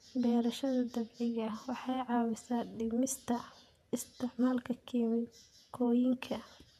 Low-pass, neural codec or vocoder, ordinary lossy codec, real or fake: 14.4 kHz; vocoder, 48 kHz, 128 mel bands, Vocos; none; fake